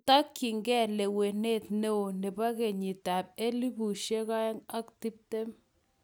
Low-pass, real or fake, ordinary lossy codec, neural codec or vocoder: none; real; none; none